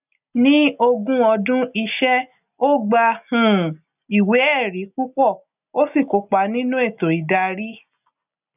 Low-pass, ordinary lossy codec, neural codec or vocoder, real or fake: 3.6 kHz; none; none; real